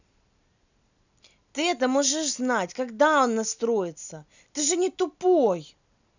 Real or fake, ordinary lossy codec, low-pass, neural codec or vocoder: real; none; 7.2 kHz; none